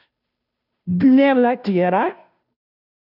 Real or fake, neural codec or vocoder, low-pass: fake; codec, 16 kHz, 0.5 kbps, FunCodec, trained on Chinese and English, 25 frames a second; 5.4 kHz